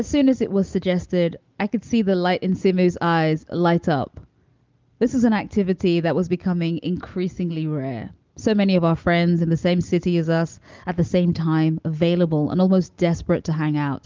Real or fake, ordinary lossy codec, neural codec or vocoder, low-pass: real; Opus, 24 kbps; none; 7.2 kHz